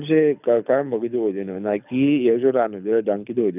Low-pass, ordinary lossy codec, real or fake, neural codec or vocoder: 3.6 kHz; none; fake; codec, 24 kHz, 6 kbps, HILCodec